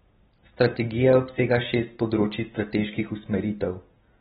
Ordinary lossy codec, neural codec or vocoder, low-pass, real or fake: AAC, 16 kbps; none; 19.8 kHz; real